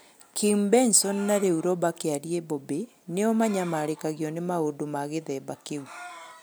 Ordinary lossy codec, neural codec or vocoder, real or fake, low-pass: none; none; real; none